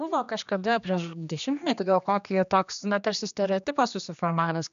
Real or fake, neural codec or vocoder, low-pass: fake; codec, 16 kHz, 2 kbps, X-Codec, HuBERT features, trained on general audio; 7.2 kHz